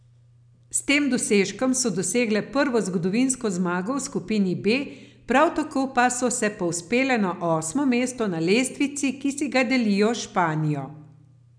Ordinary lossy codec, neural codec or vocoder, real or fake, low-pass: none; none; real; 9.9 kHz